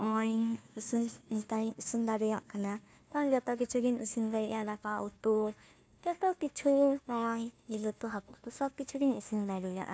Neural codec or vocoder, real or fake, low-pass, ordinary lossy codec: codec, 16 kHz, 1 kbps, FunCodec, trained on Chinese and English, 50 frames a second; fake; none; none